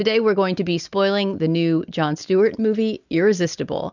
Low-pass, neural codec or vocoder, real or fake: 7.2 kHz; none; real